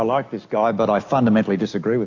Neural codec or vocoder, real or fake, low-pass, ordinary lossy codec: none; real; 7.2 kHz; AAC, 48 kbps